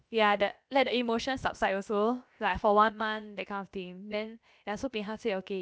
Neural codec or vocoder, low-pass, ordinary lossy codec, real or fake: codec, 16 kHz, about 1 kbps, DyCAST, with the encoder's durations; none; none; fake